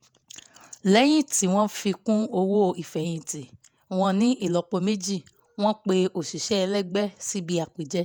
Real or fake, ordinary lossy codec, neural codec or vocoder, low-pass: fake; none; vocoder, 48 kHz, 128 mel bands, Vocos; none